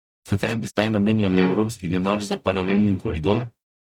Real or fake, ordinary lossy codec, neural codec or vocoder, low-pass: fake; none; codec, 44.1 kHz, 0.9 kbps, DAC; 19.8 kHz